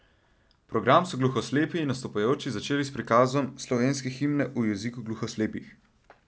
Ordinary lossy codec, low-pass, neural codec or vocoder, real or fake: none; none; none; real